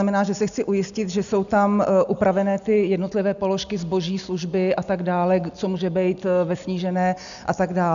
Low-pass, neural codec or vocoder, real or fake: 7.2 kHz; none; real